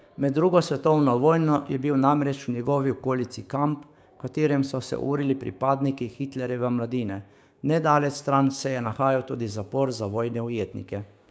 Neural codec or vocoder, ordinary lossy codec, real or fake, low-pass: codec, 16 kHz, 6 kbps, DAC; none; fake; none